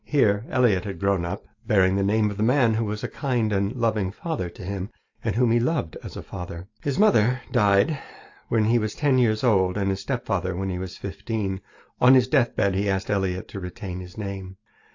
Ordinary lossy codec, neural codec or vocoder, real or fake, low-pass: AAC, 48 kbps; none; real; 7.2 kHz